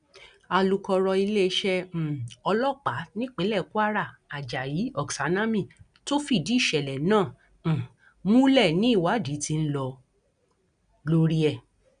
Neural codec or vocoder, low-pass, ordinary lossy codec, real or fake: none; 9.9 kHz; none; real